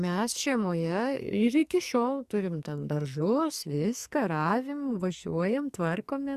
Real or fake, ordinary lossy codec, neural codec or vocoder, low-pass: fake; Opus, 64 kbps; codec, 44.1 kHz, 2.6 kbps, SNAC; 14.4 kHz